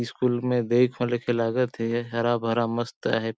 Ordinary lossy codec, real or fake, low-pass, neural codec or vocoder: none; real; none; none